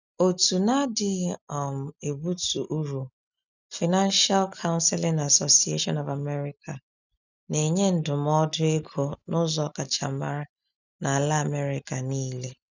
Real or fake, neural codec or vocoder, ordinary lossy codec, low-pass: real; none; none; 7.2 kHz